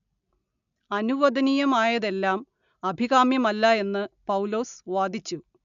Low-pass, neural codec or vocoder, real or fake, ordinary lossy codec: 7.2 kHz; none; real; none